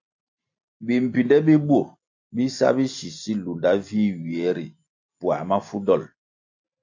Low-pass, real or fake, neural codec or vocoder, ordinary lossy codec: 7.2 kHz; real; none; AAC, 48 kbps